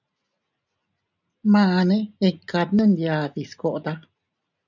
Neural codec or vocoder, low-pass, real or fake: none; 7.2 kHz; real